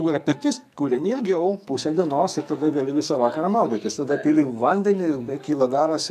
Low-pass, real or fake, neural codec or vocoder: 14.4 kHz; fake; codec, 44.1 kHz, 2.6 kbps, SNAC